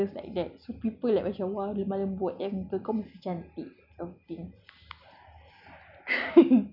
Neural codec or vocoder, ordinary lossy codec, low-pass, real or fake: none; none; 5.4 kHz; real